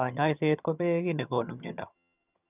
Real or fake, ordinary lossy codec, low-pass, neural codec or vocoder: fake; none; 3.6 kHz; vocoder, 22.05 kHz, 80 mel bands, HiFi-GAN